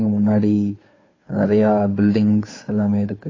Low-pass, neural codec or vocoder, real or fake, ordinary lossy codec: 7.2 kHz; codec, 16 kHz, 2 kbps, FunCodec, trained on Chinese and English, 25 frames a second; fake; AAC, 32 kbps